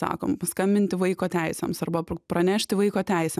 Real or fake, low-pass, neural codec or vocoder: real; 14.4 kHz; none